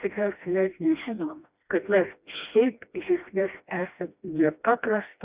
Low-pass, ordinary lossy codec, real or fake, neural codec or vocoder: 3.6 kHz; Opus, 64 kbps; fake; codec, 16 kHz, 1 kbps, FreqCodec, smaller model